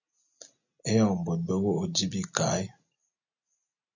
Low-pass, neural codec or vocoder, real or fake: 7.2 kHz; none; real